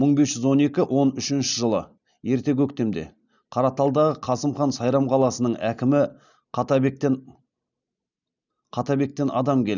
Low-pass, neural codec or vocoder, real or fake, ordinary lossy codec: 7.2 kHz; none; real; none